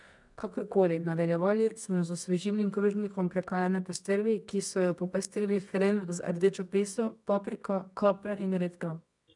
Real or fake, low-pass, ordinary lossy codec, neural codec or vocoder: fake; 10.8 kHz; none; codec, 24 kHz, 0.9 kbps, WavTokenizer, medium music audio release